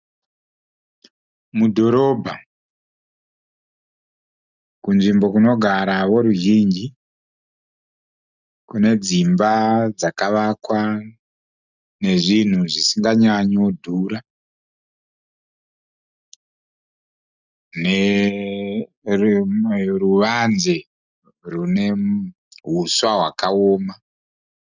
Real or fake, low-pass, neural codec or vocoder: real; 7.2 kHz; none